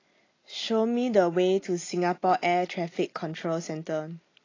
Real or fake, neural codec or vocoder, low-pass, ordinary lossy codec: real; none; 7.2 kHz; AAC, 32 kbps